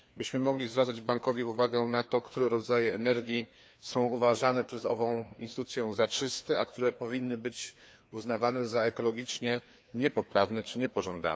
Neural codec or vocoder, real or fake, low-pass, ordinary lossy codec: codec, 16 kHz, 2 kbps, FreqCodec, larger model; fake; none; none